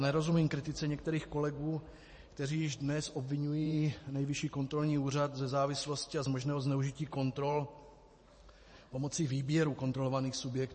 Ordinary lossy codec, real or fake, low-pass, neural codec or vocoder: MP3, 32 kbps; fake; 10.8 kHz; vocoder, 44.1 kHz, 128 mel bands every 512 samples, BigVGAN v2